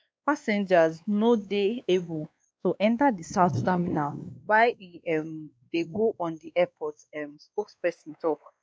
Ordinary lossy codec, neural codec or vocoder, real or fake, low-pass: none; codec, 16 kHz, 2 kbps, X-Codec, WavLM features, trained on Multilingual LibriSpeech; fake; none